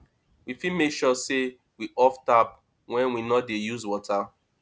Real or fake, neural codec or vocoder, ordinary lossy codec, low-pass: real; none; none; none